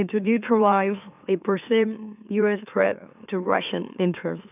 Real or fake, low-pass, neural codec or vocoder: fake; 3.6 kHz; autoencoder, 44.1 kHz, a latent of 192 numbers a frame, MeloTTS